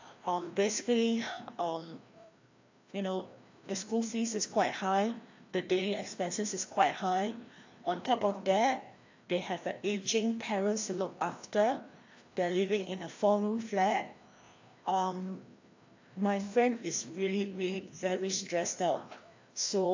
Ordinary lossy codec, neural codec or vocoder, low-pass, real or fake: AAC, 48 kbps; codec, 16 kHz, 1 kbps, FreqCodec, larger model; 7.2 kHz; fake